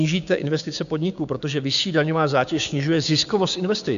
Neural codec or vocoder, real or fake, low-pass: codec, 16 kHz, 6 kbps, DAC; fake; 7.2 kHz